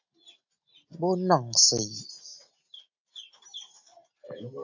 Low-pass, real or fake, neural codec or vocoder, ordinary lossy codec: 7.2 kHz; real; none; AAC, 48 kbps